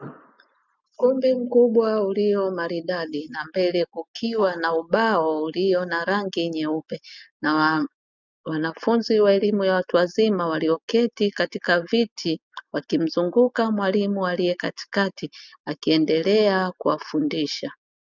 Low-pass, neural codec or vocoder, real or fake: 7.2 kHz; none; real